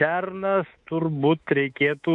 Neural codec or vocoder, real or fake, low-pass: codec, 24 kHz, 3.1 kbps, DualCodec; fake; 10.8 kHz